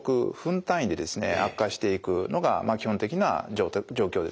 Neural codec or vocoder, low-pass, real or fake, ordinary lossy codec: none; none; real; none